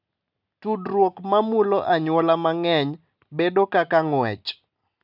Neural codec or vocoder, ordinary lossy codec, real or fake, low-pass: none; none; real; 5.4 kHz